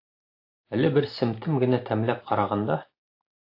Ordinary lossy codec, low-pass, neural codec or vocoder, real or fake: AAC, 32 kbps; 5.4 kHz; none; real